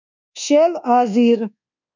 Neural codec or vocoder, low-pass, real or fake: codec, 24 kHz, 1.2 kbps, DualCodec; 7.2 kHz; fake